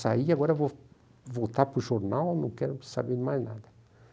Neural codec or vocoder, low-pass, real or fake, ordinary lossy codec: none; none; real; none